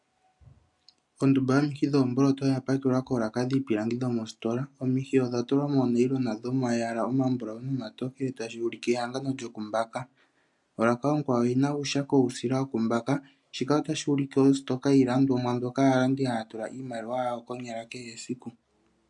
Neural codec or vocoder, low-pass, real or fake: none; 10.8 kHz; real